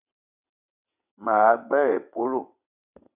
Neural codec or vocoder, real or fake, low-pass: vocoder, 22.05 kHz, 80 mel bands, WaveNeXt; fake; 3.6 kHz